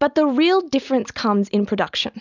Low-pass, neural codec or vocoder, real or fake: 7.2 kHz; none; real